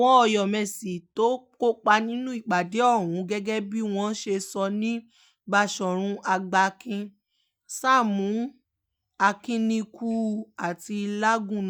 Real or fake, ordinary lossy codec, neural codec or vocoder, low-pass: real; none; none; none